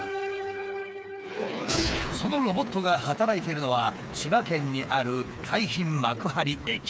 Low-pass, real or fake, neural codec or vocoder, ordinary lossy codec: none; fake; codec, 16 kHz, 4 kbps, FreqCodec, smaller model; none